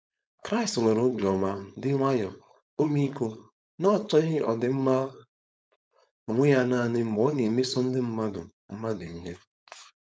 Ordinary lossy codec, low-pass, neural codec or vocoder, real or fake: none; none; codec, 16 kHz, 4.8 kbps, FACodec; fake